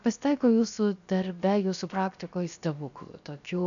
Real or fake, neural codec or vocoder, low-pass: fake; codec, 16 kHz, 0.7 kbps, FocalCodec; 7.2 kHz